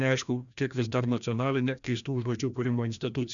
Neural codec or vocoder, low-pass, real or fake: codec, 16 kHz, 1 kbps, FreqCodec, larger model; 7.2 kHz; fake